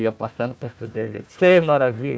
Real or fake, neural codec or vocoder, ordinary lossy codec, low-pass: fake; codec, 16 kHz, 1 kbps, FunCodec, trained on Chinese and English, 50 frames a second; none; none